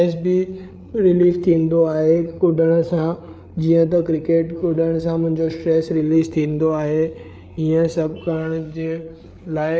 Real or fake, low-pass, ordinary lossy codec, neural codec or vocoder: fake; none; none; codec, 16 kHz, 8 kbps, FreqCodec, larger model